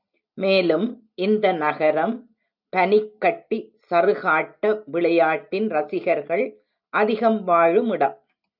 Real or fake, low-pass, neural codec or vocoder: real; 5.4 kHz; none